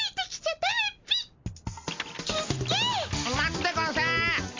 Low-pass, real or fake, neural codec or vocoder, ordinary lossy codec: 7.2 kHz; real; none; MP3, 48 kbps